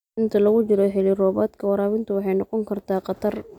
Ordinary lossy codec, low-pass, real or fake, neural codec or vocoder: none; 19.8 kHz; real; none